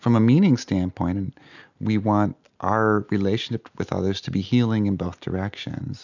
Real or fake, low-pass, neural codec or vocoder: real; 7.2 kHz; none